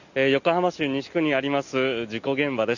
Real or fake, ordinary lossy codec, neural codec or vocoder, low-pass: real; none; none; 7.2 kHz